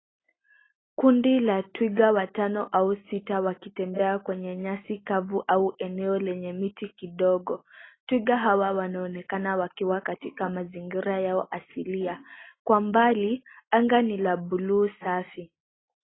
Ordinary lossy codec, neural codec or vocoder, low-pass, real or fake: AAC, 16 kbps; none; 7.2 kHz; real